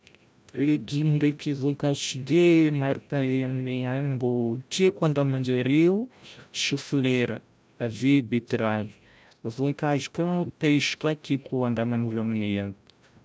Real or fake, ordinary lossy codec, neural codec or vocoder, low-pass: fake; none; codec, 16 kHz, 0.5 kbps, FreqCodec, larger model; none